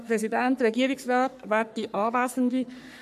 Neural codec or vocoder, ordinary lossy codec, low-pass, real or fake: codec, 44.1 kHz, 3.4 kbps, Pupu-Codec; none; 14.4 kHz; fake